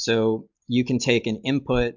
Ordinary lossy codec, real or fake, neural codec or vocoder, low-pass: MP3, 64 kbps; real; none; 7.2 kHz